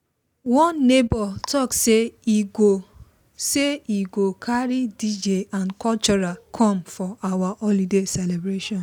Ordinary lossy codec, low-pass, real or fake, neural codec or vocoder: none; none; real; none